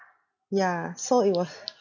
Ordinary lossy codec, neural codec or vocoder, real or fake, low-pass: none; none; real; 7.2 kHz